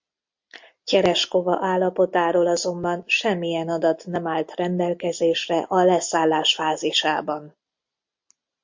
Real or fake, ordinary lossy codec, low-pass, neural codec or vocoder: real; MP3, 48 kbps; 7.2 kHz; none